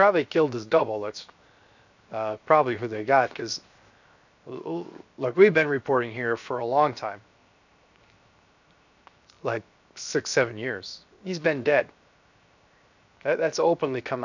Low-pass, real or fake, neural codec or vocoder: 7.2 kHz; fake; codec, 16 kHz, 0.7 kbps, FocalCodec